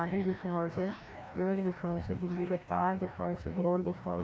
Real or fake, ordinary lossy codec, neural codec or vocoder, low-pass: fake; none; codec, 16 kHz, 1 kbps, FreqCodec, larger model; none